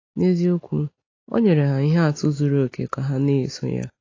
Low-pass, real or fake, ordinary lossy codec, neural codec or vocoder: 7.2 kHz; real; AAC, 32 kbps; none